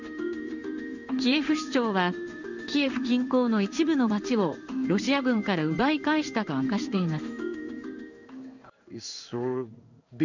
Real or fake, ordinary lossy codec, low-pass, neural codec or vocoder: fake; none; 7.2 kHz; codec, 16 kHz in and 24 kHz out, 1 kbps, XY-Tokenizer